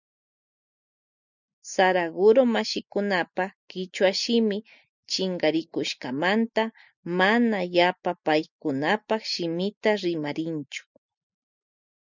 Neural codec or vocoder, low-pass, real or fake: none; 7.2 kHz; real